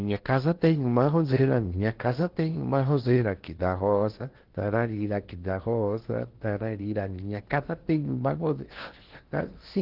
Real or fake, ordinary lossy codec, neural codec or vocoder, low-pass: fake; Opus, 16 kbps; codec, 16 kHz in and 24 kHz out, 0.8 kbps, FocalCodec, streaming, 65536 codes; 5.4 kHz